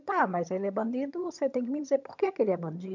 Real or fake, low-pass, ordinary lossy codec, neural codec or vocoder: fake; 7.2 kHz; MP3, 64 kbps; vocoder, 22.05 kHz, 80 mel bands, HiFi-GAN